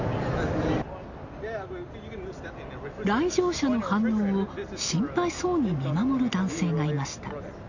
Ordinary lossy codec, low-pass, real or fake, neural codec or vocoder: none; 7.2 kHz; real; none